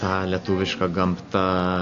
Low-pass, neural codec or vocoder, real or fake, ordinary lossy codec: 7.2 kHz; none; real; AAC, 48 kbps